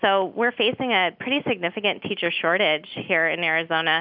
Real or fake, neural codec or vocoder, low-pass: real; none; 5.4 kHz